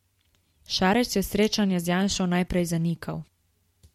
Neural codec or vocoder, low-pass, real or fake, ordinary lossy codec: vocoder, 44.1 kHz, 128 mel bands every 512 samples, BigVGAN v2; 19.8 kHz; fake; MP3, 64 kbps